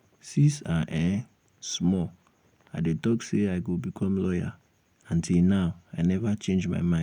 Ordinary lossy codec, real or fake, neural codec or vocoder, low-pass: none; real; none; 19.8 kHz